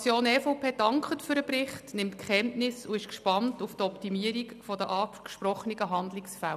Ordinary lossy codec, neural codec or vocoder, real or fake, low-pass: none; none; real; 14.4 kHz